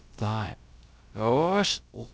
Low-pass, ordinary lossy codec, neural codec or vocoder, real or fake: none; none; codec, 16 kHz, about 1 kbps, DyCAST, with the encoder's durations; fake